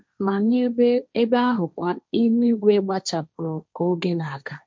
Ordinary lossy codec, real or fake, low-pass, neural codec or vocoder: none; fake; none; codec, 16 kHz, 1.1 kbps, Voila-Tokenizer